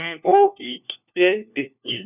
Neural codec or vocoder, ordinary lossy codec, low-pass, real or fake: codec, 16 kHz, 1 kbps, FunCodec, trained on LibriTTS, 50 frames a second; none; 3.6 kHz; fake